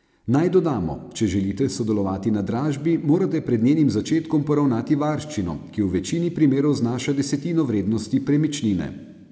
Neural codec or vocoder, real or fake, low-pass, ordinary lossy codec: none; real; none; none